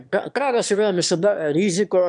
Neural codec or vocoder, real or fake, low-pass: autoencoder, 22.05 kHz, a latent of 192 numbers a frame, VITS, trained on one speaker; fake; 9.9 kHz